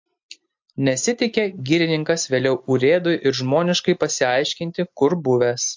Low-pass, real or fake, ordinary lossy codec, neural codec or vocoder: 7.2 kHz; real; MP3, 48 kbps; none